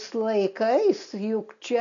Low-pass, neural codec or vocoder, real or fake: 7.2 kHz; none; real